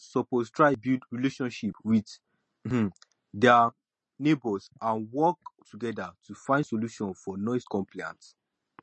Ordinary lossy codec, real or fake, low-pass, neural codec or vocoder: MP3, 32 kbps; real; 10.8 kHz; none